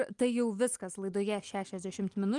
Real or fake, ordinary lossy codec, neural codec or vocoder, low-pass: real; Opus, 32 kbps; none; 10.8 kHz